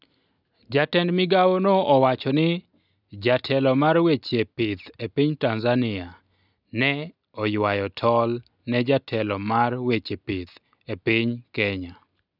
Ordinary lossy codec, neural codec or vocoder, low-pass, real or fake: none; none; 5.4 kHz; real